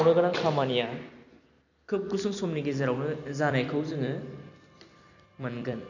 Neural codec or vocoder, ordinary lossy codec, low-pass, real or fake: vocoder, 44.1 kHz, 128 mel bands every 256 samples, BigVGAN v2; none; 7.2 kHz; fake